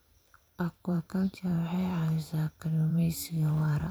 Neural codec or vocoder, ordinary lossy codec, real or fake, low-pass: vocoder, 44.1 kHz, 128 mel bands every 512 samples, BigVGAN v2; none; fake; none